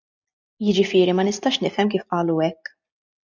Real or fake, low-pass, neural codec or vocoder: real; 7.2 kHz; none